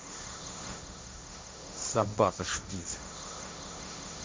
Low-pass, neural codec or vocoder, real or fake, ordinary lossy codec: none; codec, 16 kHz, 1.1 kbps, Voila-Tokenizer; fake; none